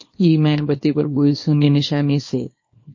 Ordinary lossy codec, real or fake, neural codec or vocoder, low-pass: MP3, 32 kbps; fake; codec, 24 kHz, 0.9 kbps, WavTokenizer, small release; 7.2 kHz